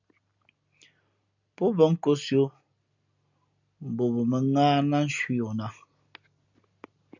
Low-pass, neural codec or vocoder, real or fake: 7.2 kHz; none; real